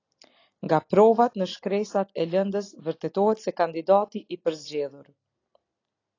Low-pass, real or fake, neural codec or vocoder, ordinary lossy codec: 7.2 kHz; real; none; AAC, 32 kbps